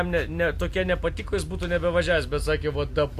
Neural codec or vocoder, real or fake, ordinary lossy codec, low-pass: none; real; Opus, 64 kbps; 14.4 kHz